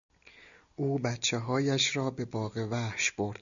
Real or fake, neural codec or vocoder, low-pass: real; none; 7.2 kHz